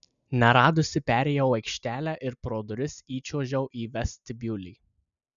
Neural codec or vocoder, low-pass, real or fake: none; 7.2 kHz; real